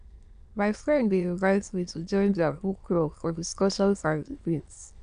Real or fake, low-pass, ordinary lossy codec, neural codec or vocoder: fake; 9.9 kHz; none; autoencoder, 22.05 kHz, a latent of 192 numbers a frame, VITS, trained on many speakers